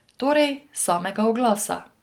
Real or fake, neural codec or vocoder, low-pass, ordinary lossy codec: real; none; 19.8 kHz; Opus, 24 kbps